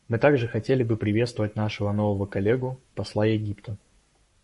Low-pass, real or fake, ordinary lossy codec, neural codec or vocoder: 14.4 kHz; fake; MP3, 48 kbps; codec, 44.1 kHz, 7.8 kbps, Pupu-Codec